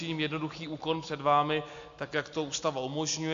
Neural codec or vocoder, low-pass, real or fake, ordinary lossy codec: none; 7.2 kHz; real; AAC, 64 kbps